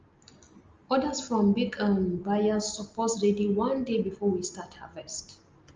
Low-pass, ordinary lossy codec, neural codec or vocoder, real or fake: 7.2 kHz; Opus, 32 kbps; none; real